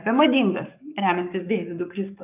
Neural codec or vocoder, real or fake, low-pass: codec, 44.1 kHz, 7.8 kbps, Pupu-Codec; fake; 3.6 kHz